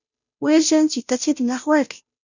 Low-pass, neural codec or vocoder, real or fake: 7.2 kHz; codec, 16 kHz, 0.5 kbps, FunCodec, trained on Chinese and English, 25 frames a second; fake